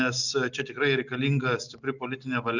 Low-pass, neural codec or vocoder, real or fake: 7.2 kHz; none; real